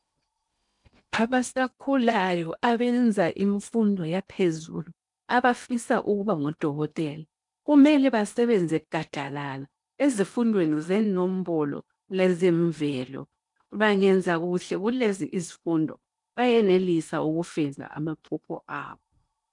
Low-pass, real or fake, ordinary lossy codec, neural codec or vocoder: 10.8 kHz; fake; MP3, 96 kbps; codec, 16 kHz in and 24 kHz out, 0.8 kbps, FocalCodec, streaming, 65536 codes